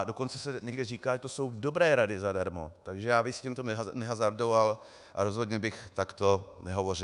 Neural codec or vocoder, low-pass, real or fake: codec, 24 kHz, 1.2 kbps, DualCodec; 10.8 kHz; fake